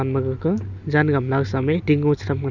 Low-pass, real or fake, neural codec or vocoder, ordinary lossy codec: 7.2 kHz; real; none; none